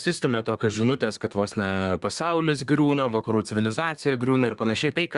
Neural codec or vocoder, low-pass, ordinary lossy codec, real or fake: codec, 24 kHz, 1 kbps, SNAC; 10.8 kHz; Opus, 64 kbps; fake